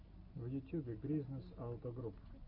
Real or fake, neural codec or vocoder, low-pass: real; none; 5.4 kHz